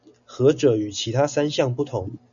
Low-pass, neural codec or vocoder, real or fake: 7.2 kHz; none; real